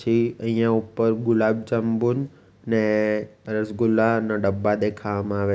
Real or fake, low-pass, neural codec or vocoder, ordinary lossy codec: real; none; none; none